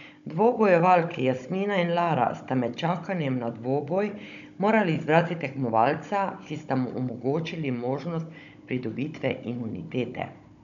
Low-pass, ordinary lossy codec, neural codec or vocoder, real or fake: 7.2 kHz; none; codec, 16 kHz, 16 kbps, FunCodec, trained on Chinese and English, 50 frames a second; fake